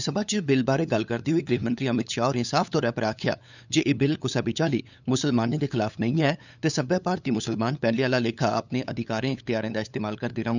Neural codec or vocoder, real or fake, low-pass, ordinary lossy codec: codec, 16 kHz, 8 kbps, FunCodec, trained on LibriTTS, 25 frames a second; fake; 7.2 kHz; none